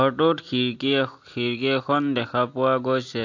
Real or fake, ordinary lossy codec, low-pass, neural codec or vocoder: real; AAC, 48 kbps; 7.2 kHz; none